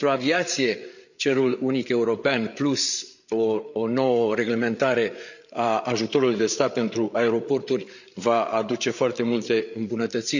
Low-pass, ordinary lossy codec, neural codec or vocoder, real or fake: 7.2 kHz; none; codec, 16 kHz, 8 kbps, FreqCodec, larger model; fake